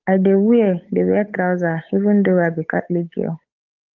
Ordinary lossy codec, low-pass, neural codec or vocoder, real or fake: Opus, 24 kbps; 7.2 kHz; codec, 16 kHz, 8 kbps, FunCodec, trained on Chinese and English, 25 frames a second; fake